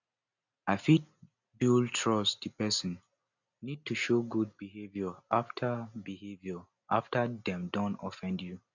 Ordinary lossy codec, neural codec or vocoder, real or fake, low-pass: none; none; real; 7.2 kHz